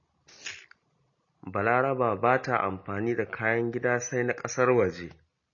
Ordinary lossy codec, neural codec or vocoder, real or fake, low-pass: MP3, 32 kbps; none; real; 7.2 kHz